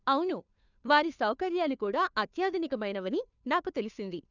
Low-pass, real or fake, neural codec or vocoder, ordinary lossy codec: 7.2 kHz; fake; codec, 16 kHz, 2 kbps, FunCodec, trained on Chinese and English, 25 frames a second; none